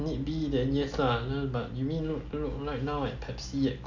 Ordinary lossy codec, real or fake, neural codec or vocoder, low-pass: none; real; none; 7.2 kHz